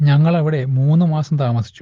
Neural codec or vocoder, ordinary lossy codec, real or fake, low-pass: none; Opus, 16 kbps; real; 7.2 kHz